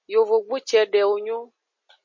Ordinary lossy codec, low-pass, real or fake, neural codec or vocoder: MP3, 32 kbps; 7.2 kHz; real; none